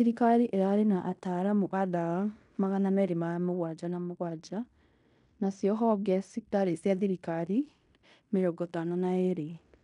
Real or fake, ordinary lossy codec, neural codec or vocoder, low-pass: fake; none; codec, 16 kHz in and 24 kHz out, 0.9 kbps, LongCat-Audio-Codec, fine tuned four codebook decoder; 10.8 kHz